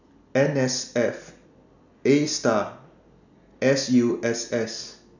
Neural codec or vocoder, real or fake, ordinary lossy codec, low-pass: none; real; none; 7.2 kHz